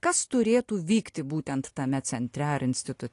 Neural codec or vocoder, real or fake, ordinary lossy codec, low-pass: none; real; AAC, 48 kbps; 10.8 kHz